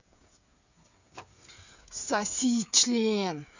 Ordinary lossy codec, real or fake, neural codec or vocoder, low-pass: none; fake; codec, 16 kHz, 8 kbps, FreqCodec, smaller model; 7.2 kHz